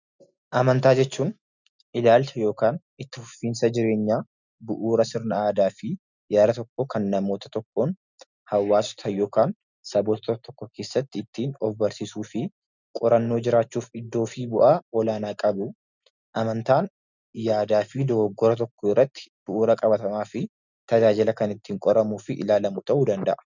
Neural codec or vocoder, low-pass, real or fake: none; 7.2 kHz; real